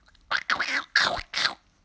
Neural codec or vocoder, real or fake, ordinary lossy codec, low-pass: none; real; none; none